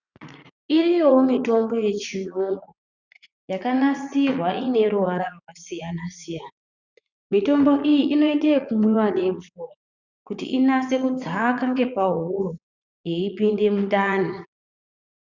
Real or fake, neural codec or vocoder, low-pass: fake; vocoder, 44.1 kHz, 80 mel bands, Vocos; 7.2 kHz